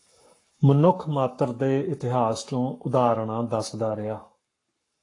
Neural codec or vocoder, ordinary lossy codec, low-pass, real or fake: codec, 44.1 kHz, 7.8 kbps, Pupu-Codec; AAC, 48 kbps; 10.8 kHz; fake